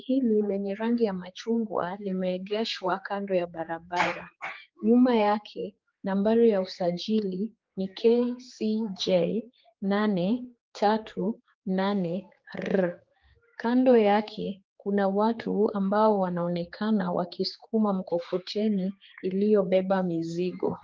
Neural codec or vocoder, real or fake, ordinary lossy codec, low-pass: codec, 16 kHz, 4 kbps, X-Codec, HuBERT features, trained on general audio; fake; Opus, 32 kbps; 7.2 kHz